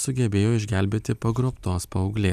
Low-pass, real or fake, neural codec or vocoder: 14.4 kHz; real; none